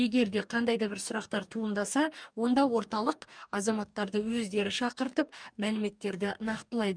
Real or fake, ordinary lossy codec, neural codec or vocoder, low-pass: fake; none; codec, 44.1 kHz, 2.6 kbps, DAC; 9.9 kHz